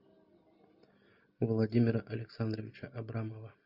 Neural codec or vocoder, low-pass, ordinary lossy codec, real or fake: none; 5.4 kHz; Opus, 64 kbps; real